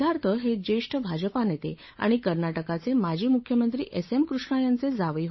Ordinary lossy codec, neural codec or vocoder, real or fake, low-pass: MP3, 24 kbps; codec, 16 kHz, 8 kbps, FunCodec, trained on Chinese and English, 25 frames a second; fake; 7.2 kHz